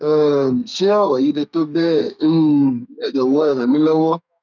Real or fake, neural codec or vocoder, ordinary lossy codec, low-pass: fake; codec, 32 kHz, 1.9 kbps, SNAC; none; 7.2 kHz